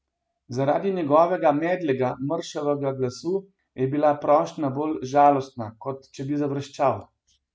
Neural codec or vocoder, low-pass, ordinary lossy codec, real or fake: none; none; none; real